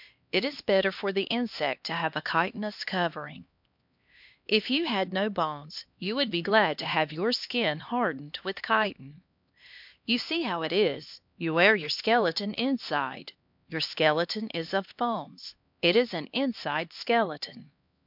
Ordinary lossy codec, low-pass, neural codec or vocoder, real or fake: MP3, 48 kbps; 5.4 kHz; codec, 16 kHz, 2 kbps, X-Codec, HuBERT features, trained on LibriSpeech; fake